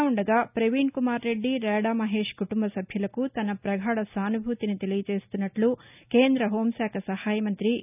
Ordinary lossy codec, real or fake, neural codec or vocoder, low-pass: none; real; none; 3.6 kHz